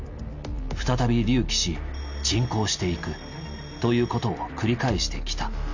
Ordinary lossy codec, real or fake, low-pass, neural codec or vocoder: none; real; 7.2 kHz; none